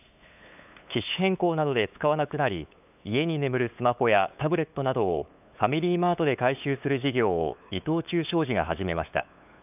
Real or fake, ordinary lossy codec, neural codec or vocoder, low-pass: fake; none; codec, 16 kHz, 8 kbps, FunCodec, trained on LibriTTS, 25 frames a second; 3.6 kHz